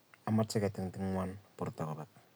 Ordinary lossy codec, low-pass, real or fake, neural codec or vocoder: none; none; real; none